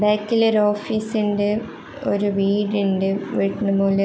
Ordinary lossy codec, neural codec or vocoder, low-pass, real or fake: none; none; none; real